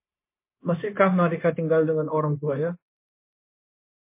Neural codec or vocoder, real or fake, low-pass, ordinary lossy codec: codec, 16 kHz, 0.9 kbps, LongCat-Audio-Codec; fake; 3.6 kHz; MP3, 24 kbps